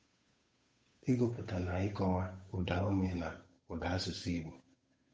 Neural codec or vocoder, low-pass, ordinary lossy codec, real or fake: codec, 16 kHz, 8 kbps, FunCodec, trained on Chinese and English, 25 frames a second; none; none; fake